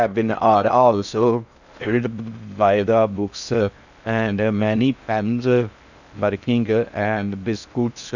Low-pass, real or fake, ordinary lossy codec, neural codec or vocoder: 7.2 kHz; fake; none; codec, 16 kHz in and 24 kHz out, 0.6 kbps, FocalCodec, streaming, 4096 codes